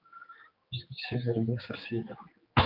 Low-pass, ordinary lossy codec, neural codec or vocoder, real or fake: 5.4 kHz; Opus, 24 kbps; codec, 16 kHz, 4 kbps, X-Codec, HuBERT features, trained on general audio; fake